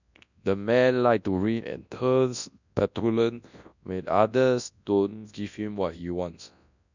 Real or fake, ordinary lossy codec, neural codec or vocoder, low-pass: fake; none; codec, 24 kHz, 0.9 kbps, WavTokenizer, large speech release; 7.2 kHz